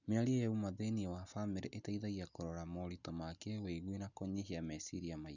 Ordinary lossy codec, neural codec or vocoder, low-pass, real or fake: none; none; 7.2 kHz; real